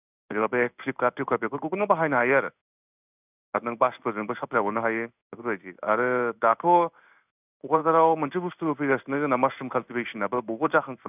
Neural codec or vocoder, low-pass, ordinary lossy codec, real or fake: codec, 16 kHz in and 24 kHz out, 1 kbps, XY-Tokenizer; 3.6 kHz; none; fake